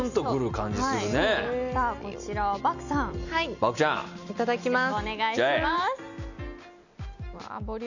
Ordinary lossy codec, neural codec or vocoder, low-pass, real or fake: none; none; 7.2 kHz; real